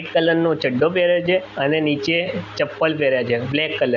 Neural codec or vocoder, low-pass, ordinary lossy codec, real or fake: none; 7.2 kHz; none; real